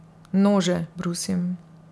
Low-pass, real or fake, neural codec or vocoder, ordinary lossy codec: none; real; none; none